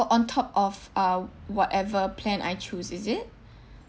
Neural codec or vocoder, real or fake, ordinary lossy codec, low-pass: none; real; none; none